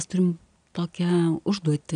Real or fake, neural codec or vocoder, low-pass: real; none; 9.9 kHz